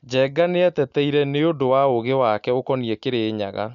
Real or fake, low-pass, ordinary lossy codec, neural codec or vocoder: real; 7.2 kHz; none; none